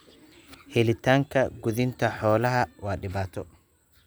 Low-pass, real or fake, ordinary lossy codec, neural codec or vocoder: none; real; none; none